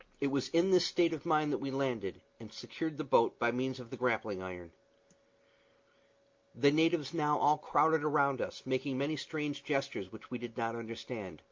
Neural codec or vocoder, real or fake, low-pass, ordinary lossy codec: none; real; 7.2 kHz; Opus, 32 kbps